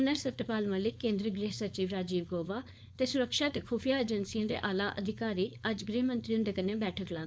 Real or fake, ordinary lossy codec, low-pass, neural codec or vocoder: fake; none; none; codec, 16 kHz, 4.8 kbps, FACodec